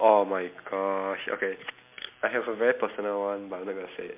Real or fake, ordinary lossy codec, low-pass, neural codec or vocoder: real; MP3, 32 kbps; 3.6 kHz; none